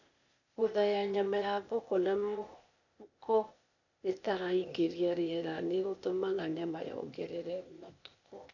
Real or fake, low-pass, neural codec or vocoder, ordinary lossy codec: fake; 7.2 kHz; codec, 16 kHz, 0.8 kbps, ZipCodec; none